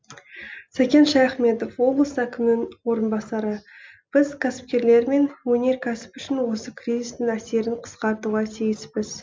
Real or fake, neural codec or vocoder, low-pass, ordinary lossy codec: real; none; none; none